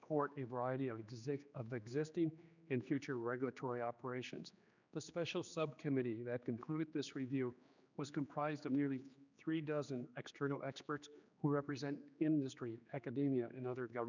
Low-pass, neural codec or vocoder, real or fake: 7.2 kHz; codec, 16 kHz, 2 kbps, X-Codec, HuBERT features, trained on general audio; fake